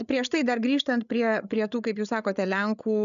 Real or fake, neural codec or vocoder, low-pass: fake; codec, 16 kHz, 8 kbps, FreqCodec, larger model; 7.2 kHz